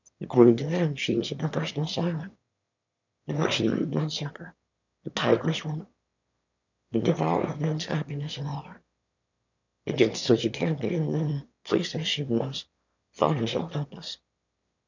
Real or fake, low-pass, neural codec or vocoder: fake; 7.2 kHz; autoencoder, 22.05 kHz, a latent of 192 numbers a frame, VITS, trained on one speaker